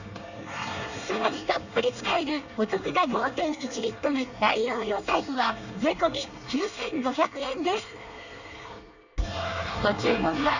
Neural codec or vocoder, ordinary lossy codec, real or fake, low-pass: codec, 24 kHz, 1 kbps, SNAC; none; fake; 7.2 kHz